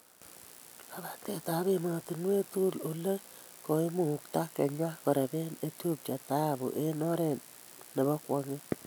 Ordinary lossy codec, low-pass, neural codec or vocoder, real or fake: none; none; none; real